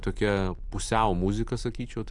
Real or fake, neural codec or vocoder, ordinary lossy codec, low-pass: real; none; AAC, 64 kbps; 10.8 kHz